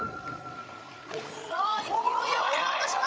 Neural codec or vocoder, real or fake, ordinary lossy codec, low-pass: codec, 16 kHz, 8 kbps, FreqCodec, larger model; fake; none; none